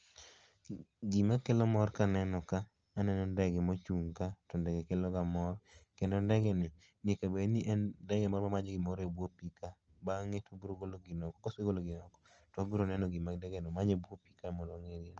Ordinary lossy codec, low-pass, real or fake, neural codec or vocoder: Opus, 24 kbps; 7.2 kHz; real; none